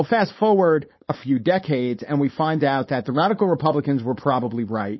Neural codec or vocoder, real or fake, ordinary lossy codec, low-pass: codec, 16 kHz, 4.8 kbps, FACodec; fake; MP3, 24 kbps; 7.2 kHz